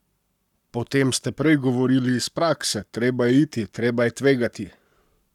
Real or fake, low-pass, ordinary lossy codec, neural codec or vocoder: fake; 19.8 kHz; none; codec, 44.1 kHz, 7.8 kbps, Pupu-Codec